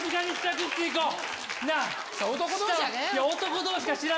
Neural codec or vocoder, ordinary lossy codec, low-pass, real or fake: none; none; none; real